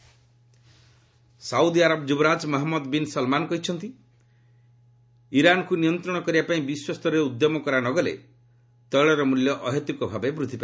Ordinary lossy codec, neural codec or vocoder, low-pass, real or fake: none; none; none; real